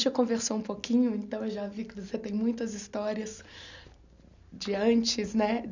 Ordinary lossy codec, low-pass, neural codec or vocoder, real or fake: none; 7.2 kHz; none; real